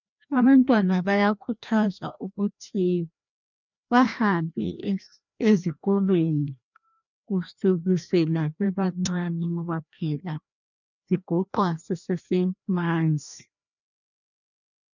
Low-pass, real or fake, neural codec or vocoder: 7.2 kHz; fake; codec, 16 kHz, 1 kbps, FreqCodec, larger model